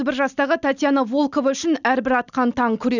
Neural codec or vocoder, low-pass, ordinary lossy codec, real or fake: none; 7.2 kHz; none; real